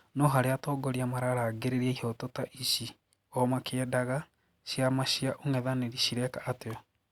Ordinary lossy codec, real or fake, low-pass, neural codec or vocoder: Opus, 64 kbps; real; 19.8 kHz; none